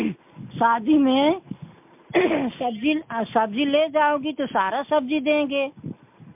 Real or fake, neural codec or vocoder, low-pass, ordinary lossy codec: real; none; 3.6 kHz; MP3, 32 kbps